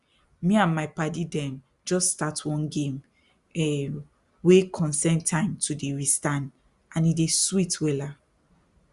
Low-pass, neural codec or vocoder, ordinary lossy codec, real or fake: 10.8 kHz; none; Opus, 64 kbps; real